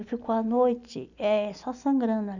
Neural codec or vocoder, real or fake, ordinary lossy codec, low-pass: none; real; none; 7.2 kHz